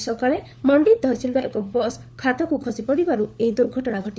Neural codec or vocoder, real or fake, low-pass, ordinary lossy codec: codec, 16 kHz, 4 kbps, FunCodec, trained on LibriTTS, 50 frames a second; fake; none; none